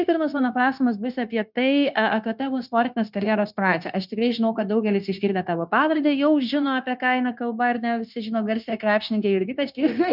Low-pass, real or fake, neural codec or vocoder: 5.4 kHz; fake; codec, 16 kHz, 0.9 kbps, LongCat-Audio-Codec